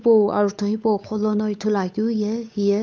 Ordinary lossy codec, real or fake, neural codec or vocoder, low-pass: none; fake; codec, 16 kHz, 8 kbps, FunCodec, trained on Chinese and English, 25 frames a second; none